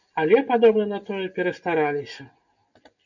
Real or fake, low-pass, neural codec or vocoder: real; 7.2 kHz; none